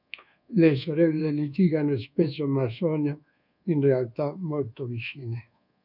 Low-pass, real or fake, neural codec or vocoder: 5.4 kHz; fake; codec, 24 kHz, 1.2 kbps, DualCodec